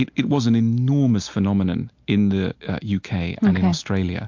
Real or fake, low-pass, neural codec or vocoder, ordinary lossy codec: real; 7.2 kHz; none; MP3, 48 kbps